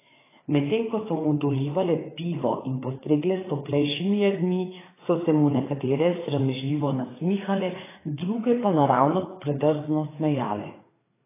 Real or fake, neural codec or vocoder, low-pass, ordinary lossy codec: fake; codec, 16 kHz, 4 kbps, FreqCodec, larger model; 3.6 kHz; AAC, 16 kbps